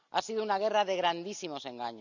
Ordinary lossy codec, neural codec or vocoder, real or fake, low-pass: none; none; real; 7.2 kHz